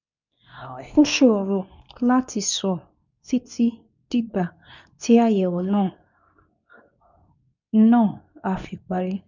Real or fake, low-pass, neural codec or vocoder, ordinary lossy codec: fake; 7.2 kHz; codec, 24 kHz, 0.9 kbps, WavTokenizer, medium speech release version 2; none